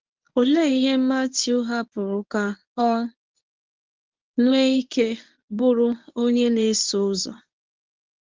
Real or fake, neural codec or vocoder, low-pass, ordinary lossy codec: fake; codec, 24 kHz, 0.9 kbps, WavTokenizer, medium speech release version 1; 7.2 kHz; Opus, 24 kbps